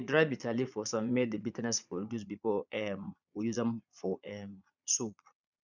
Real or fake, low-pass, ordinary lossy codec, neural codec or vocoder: fake; 7.2 kHz; none; vocoder, 22.05 kHz, 80 mel bands, Vocos